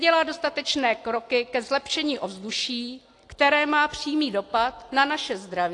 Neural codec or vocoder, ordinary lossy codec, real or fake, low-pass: none; AAC, 48 kbps; real; 10.8 kHz